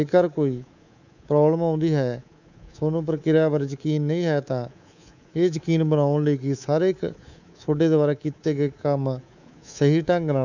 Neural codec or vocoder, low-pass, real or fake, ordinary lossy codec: codec, 24 kHz, 3.1 kbps, DualCodec; 7.2 kHz; fake; none